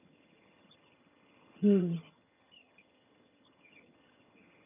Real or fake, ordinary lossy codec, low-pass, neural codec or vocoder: fake; none; 3.6 kHz; vocoder, 22.05 kHz, 80 mel bands, HiFi-GAN